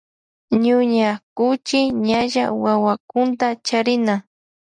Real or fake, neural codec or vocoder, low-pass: real; none; 9.9 kHz